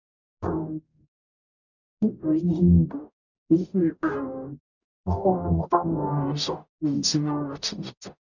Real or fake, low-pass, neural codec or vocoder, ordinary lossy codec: fake; 7.2 kHz; codec, 44.1 kHz, 0.9 kbps, DAC; none